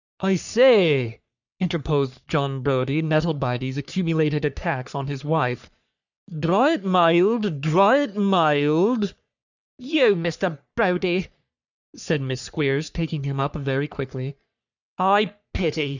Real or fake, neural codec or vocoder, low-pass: fake; codec, 44.1 kHz, 3.4 kbps, Pupu-Codec; 7.2 kHz